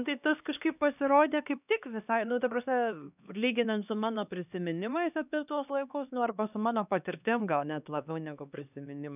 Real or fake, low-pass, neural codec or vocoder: fake; 3.6 kHz; codec, 16 kHz, 2 kbps, X-Codec, HuBERT features, trained on LibriSpeech